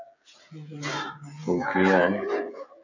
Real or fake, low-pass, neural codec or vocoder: fake; 7.2 kHz; codec, 16 kHz, 16 kbps, FreqCodec, smaller model